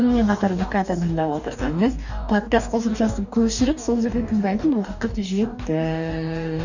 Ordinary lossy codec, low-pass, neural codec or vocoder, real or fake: none; 7.2 kHz; codec, 44.1 kHz, 2.6 kbps, DAC; fake